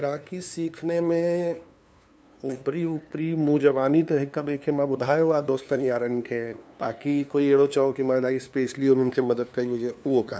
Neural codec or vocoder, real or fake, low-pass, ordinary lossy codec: codec, 16 kHz, 2 kbps, FunCodec, trained on LibriTTS, 25 frames a second; fake; none; none